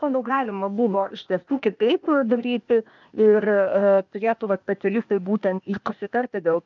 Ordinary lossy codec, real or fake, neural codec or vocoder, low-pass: MP3, 64 kbps; fake; codec, 16 kHz, 0.8 kbps, ZipCodec; 7.2 kHz